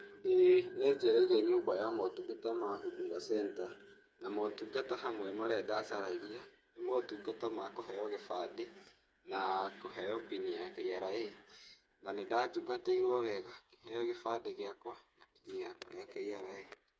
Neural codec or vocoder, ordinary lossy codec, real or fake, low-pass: codec, 16 kHz, 4 kbps, FreqCodec, smaller model; none; fake; none